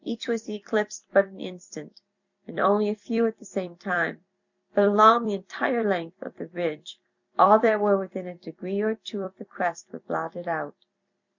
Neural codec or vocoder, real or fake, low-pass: vocoder, 44.1 kHz, 128 mel bands every 256 samples, BigVGAN v2; fake; 7.2 kHz